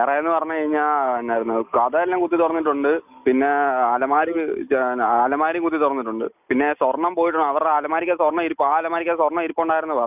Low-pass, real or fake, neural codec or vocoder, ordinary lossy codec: 3.6 kHz; real; none; none